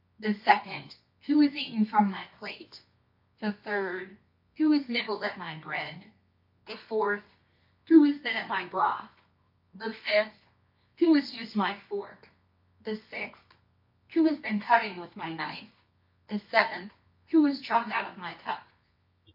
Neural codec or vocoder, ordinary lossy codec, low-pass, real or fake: codec, 24 kHz, 0.9 kbps, WavTokenizer, medium music audio release; MP3, 32 kbps; 5.4 kHz; fake